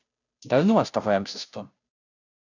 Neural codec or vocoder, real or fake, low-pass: codec, 16 kHz, 0.5 kbps, FunCodec, trained on Chinese and English, 25 frames a second; fake; 7.2 kHz